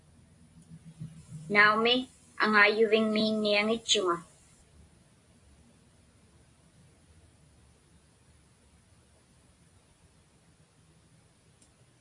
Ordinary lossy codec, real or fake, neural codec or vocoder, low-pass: AAC, 48 kbps; real; none; 10.8 kHz